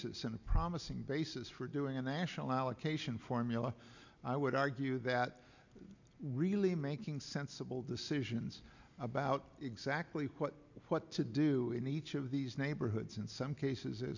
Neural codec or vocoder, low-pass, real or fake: none; 7.2 kHz; real